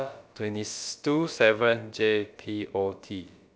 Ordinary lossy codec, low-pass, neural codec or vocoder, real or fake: none; none; codec, 16 kHz, about 1 kbps, DyCAST, with the encoder's durations; fake